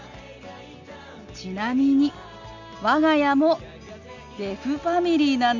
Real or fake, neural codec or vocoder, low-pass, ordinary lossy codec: real; none; 7.2 kHz; none